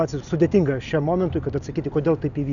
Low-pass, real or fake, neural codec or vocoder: 7.2 kHz; real; none